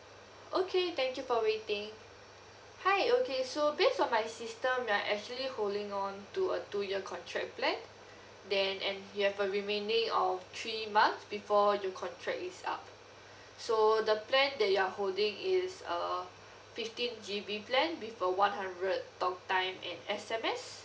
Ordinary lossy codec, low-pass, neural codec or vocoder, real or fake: none; none; none; real